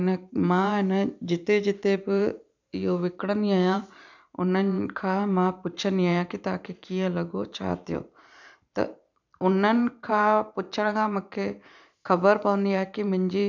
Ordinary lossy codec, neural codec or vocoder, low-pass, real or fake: none; vocoder, 44.1 kHz, 128 mel bands every 512 samples, BigVGAN v2; 7.2 kHz; fake